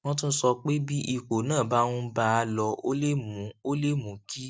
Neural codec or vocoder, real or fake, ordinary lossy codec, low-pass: none; real; none; none